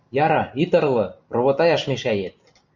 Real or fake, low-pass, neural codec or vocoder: real; 7.2 kHz; none